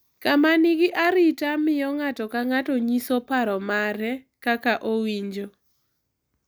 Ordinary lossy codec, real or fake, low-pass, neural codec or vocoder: none; real; none; none